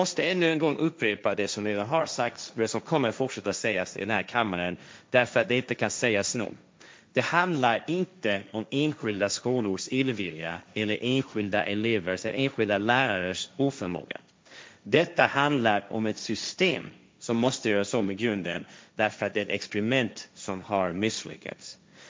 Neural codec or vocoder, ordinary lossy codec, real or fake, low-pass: codec, 16 kHz, 1.1 kbps, Voila-Tokenizer; none; fake; none